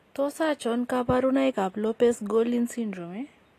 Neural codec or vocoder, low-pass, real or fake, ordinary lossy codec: none; 14.4 kHz; real; AAC, 48 kbps